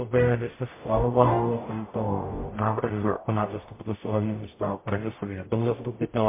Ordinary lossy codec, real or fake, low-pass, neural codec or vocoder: MP3, 24 kbps; fake; 3.6 kHz; codec, 44.1 kHz, 0.9 kbps, DAC